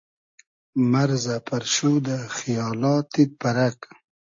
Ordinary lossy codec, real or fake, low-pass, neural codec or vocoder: AAC, 48 kbps; real; 7.2 kHz; none